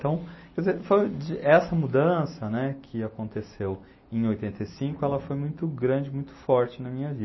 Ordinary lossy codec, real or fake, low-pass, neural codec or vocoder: MP3, 24 kbps; real; 7.2 kHz; none